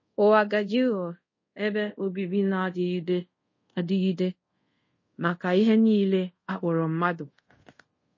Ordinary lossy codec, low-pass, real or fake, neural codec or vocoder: MP3, 32 kbps; 7.2 kHz; fake; codec, 24 kHz, 0.5 kbps, DualCodec